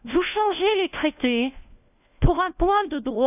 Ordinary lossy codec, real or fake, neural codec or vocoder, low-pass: none; fake; codec, 16 kHz, 1 kbps, FunCodec, trained on LibriTTS, 50 frames a second; 3.6 kHz